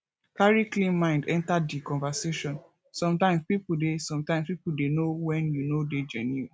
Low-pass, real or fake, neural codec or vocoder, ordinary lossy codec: none; real; none; none